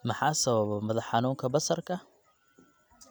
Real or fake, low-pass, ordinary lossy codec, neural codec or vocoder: real; none; none; none